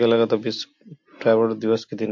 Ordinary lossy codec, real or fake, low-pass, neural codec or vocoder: MP3, 48 kbps; real; 7.2 kHz; none